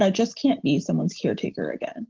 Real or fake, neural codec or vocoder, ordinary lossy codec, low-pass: real; none; Opus, 16 kbps; 7.2 kHz